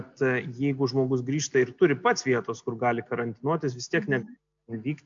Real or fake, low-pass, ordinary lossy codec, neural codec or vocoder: real; 7.2 kHz; MP3, 64 kbps; none